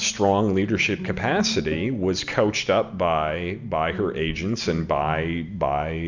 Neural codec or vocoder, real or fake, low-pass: none; real; 7.2 kHz